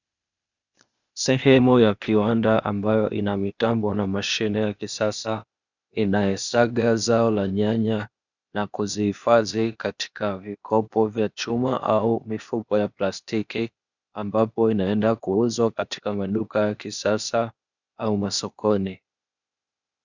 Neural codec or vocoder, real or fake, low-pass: codec, 16 kHz, 0.8 kbps, ZipCodec; fake; 7.2 kHz